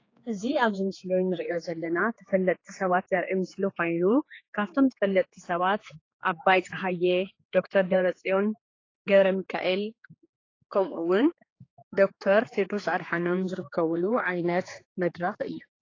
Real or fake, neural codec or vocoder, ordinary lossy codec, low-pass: fake; codec, 16 kHz, 4 kbps, X-Codec, HuBERT features, trained on general audio; AAC, 32 kbps; 7.2 kHz